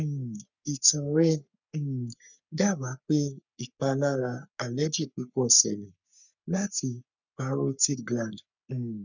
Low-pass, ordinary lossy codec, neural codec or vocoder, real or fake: 7.2 kHz; none; codec, 44.1 kHz, 3.4 kbps, Pupu-Codec; fake